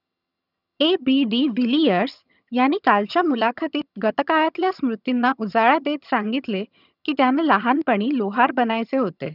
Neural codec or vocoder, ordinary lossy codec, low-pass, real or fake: vocoder, 22.05 kHz, 80 mel bands, HiFi-GAN; none; 5.4 kHz; fake